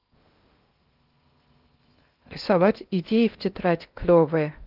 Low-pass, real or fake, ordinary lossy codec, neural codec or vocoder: 5.4 kHz; fake; Opus, 32 kbps; codec, 16 kHz in and 24 kHz out, 0.6 kbps, FocalCodec, streaming, 2048 codes